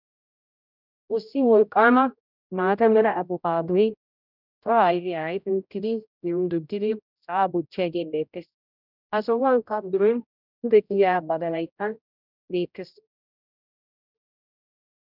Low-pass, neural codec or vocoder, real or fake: 5.4 kHz; codec, 16 kHz, 0.5 kbps, X-Codec, HuBERT features, trained on general audio; fake